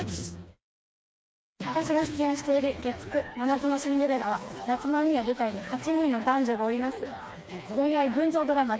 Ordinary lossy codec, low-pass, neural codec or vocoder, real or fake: none; none; codec, 16 kHz, 2 kbps, FreqCodec, smaller model; fake